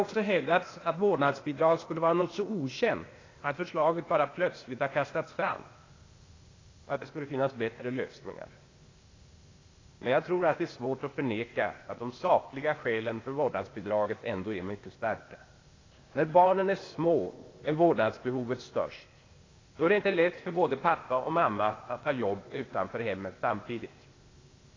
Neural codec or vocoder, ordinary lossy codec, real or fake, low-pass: codec, 16 kHz, 0.8 kbps, ZipCodec; AAC, 32 kbps; fake; 7.2 kHz